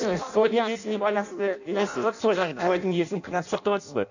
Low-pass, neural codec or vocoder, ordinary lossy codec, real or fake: 7.2 kHz; codec, 16 kHz in and 24 kHz out, 0.6 kbps, FireRedTTS-2 codec; none; fake